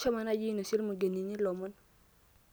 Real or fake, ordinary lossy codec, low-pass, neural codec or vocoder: real; none; none; none